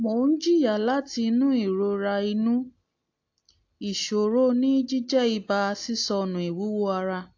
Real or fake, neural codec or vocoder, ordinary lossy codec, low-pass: real; none; AAC, 48 kbps; 7.2 kHz